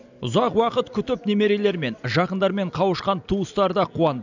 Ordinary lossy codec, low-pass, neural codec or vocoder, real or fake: none; 7.2 kHz; none; real